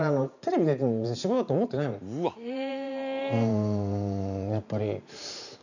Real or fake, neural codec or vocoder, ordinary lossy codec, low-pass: fake; vocoder, 44.1 kHz, 128 mel bands every 512 samples, BigVGAN v2; none; 7.2 kHz